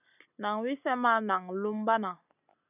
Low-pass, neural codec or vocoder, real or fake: 3.6 kHz; none; real